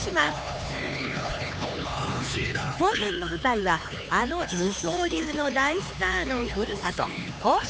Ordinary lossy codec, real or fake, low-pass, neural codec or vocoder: none; fake; none; codec, 16 kHz, 4 kbps, X-Codec, HuBERT features, trained on LibriSpeech